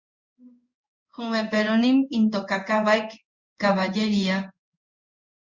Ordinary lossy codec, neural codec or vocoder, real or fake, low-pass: Opus, 64 kbps; codec, 16 kHz in and 24 kHz out, 1 kbps, XY-Tokenizer; fake; 7.2 kHz